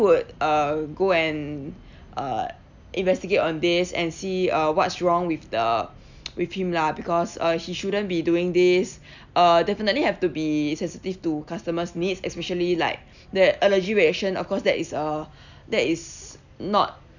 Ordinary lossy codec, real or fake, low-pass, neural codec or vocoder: none; real; 7.2 kHz; none